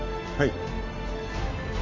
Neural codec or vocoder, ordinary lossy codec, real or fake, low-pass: none; MP3, 64 kbps; real; 7.2 kHz